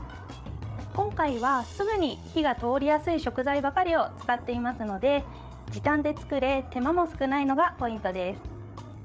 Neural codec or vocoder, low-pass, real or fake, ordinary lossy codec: codec, 16 kHz, 8 kbps, FreqCodec, larger model; none; fake; none